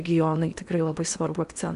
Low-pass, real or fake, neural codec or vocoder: 10.8 kHz; fake; codec, 16 kHz in and 24 kHz out, 0.8 kbps, FocalCodec, streaming, 65536 codes